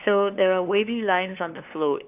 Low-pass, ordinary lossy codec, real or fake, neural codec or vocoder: 3.6 kHz; none; fake; codec, 16 kHz, 2 kbps, FunCodec, trained on LibriTTS, 25 frames a second